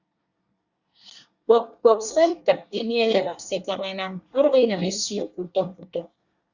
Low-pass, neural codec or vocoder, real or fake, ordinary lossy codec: 7.2 kHz; codec, 24 kHz, 1 kbps, SNAC; fake; Opus, 64 kbps